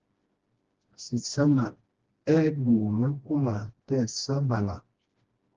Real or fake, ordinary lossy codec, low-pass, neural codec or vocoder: fake; Opus, 16 kbps; 7.2 kHz; codec, 16 kHz, 1 kbps, FreqCodec, smaller model